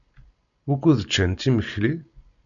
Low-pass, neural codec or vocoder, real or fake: 7.2 kHz; none; real